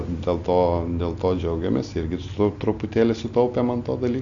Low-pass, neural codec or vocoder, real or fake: 7.2 kHz; none; real